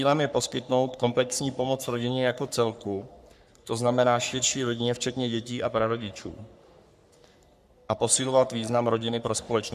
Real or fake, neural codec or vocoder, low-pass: fake; codec, 44.1 kHz, 3.4 kbps, Pupu-Codec; 14.4 kHz